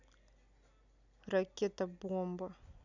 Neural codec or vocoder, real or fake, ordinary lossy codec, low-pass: none; real; none; 7.2 kHz